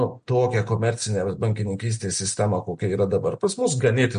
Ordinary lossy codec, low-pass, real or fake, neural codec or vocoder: MP3, 48 kbps; 9.9 kHz; real; none